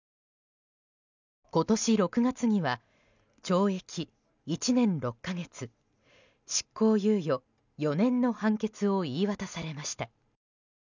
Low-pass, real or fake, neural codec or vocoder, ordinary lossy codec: 7.2 kHz; real; none; none